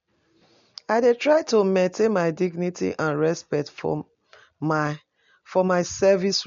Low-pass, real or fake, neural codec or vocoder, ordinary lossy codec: 7.2 kHz; real; none; MP3, 48 kbps